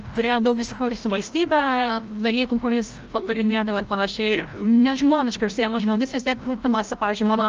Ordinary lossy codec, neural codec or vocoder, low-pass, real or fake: Opus, 24 kbps; codec, 16 kHz, 0.5 kbps, FreqCodec, larger model; 7.2 kHz; fake